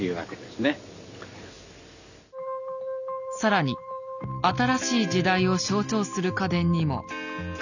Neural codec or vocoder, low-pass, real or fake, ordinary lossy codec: none; 7.2 kHz; real; none